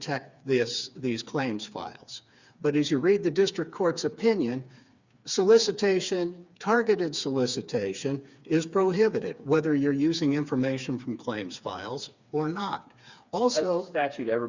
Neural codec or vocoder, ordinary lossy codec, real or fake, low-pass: codec, 16 kHz, 4 kbps, FreqCodec, smaller model; Opus, 64 kbps; fake; 7.2 kHz